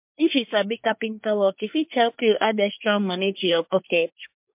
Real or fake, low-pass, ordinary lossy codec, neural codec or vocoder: fake; 3.6 kHz; MP3, 32 kbps; codec, 24 kHz, 1 kbps, SNAC